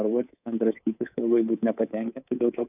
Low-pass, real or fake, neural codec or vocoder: 3.6 kHz; fake; codec, 24 kHz, 3.1 kbps, DualCodec